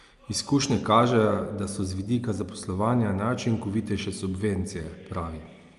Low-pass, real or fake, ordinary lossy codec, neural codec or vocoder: 10.8 kHz; real; Opus, 32 kbps; none